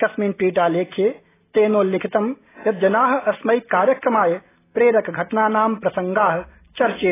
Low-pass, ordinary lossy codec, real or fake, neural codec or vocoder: 3.6 kHz; AAC, 16 kbps; real; none